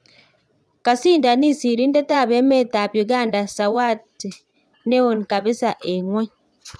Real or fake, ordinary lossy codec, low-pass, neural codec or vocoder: fake; none; none; vocoder, 22.05 kHz, 80 mel bands, Vocos